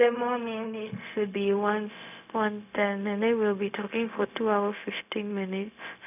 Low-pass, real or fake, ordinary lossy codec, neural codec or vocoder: 3.6 kHz; fake; none; codec, 16 kHz, 0.4 kbps, LongCat-Audio-Codec